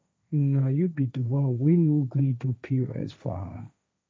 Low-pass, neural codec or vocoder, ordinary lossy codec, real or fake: none; codec, 16 kHz, 1.1 kbps, Voila-Tokenizer; none; fake